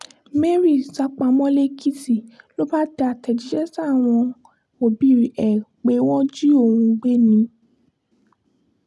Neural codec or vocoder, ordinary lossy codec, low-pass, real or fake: none; none; none; real